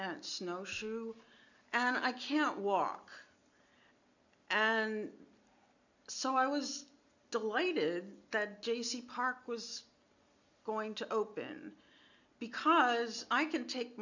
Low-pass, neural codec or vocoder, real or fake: 7.2 kHz; none; real